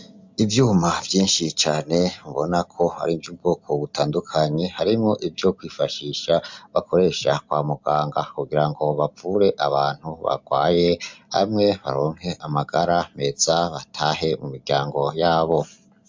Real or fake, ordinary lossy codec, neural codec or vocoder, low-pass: real; MP3, 64 kbps; none; 7.2 kHz